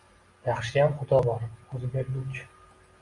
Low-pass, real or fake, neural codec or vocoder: 10.8 kHz; real; none